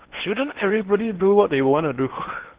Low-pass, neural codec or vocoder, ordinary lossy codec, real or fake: 3.6 kHz; codec, 16 kHz in and 24 kHz out, 0.8 kbps, FocalCodec, streaming, 65536 codes; Opus, 16 kbps; fake